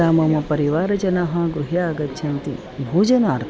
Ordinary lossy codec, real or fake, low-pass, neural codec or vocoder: none; real; none; none